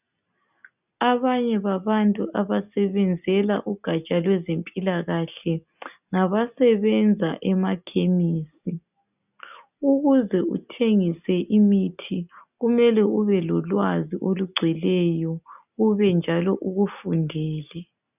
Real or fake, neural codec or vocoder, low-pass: real; none; 3.6 kHz